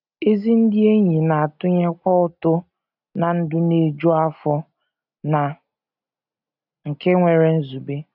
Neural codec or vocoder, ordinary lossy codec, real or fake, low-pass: none; none; real; 5.4 kHz